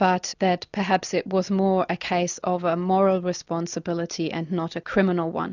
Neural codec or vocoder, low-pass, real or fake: none; 7.2 kHz; real